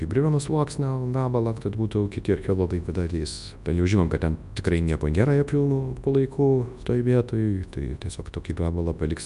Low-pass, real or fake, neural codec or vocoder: 10.8 kHz; fake; codec, 24 kHz, 0.9 kbps, WavTokenizer, large speech release